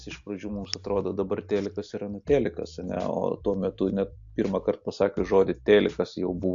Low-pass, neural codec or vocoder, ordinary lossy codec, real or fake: 7.2 kHz; none; AAC, 64 kbps; real